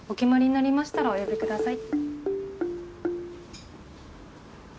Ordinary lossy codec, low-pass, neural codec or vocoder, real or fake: none; none; none; real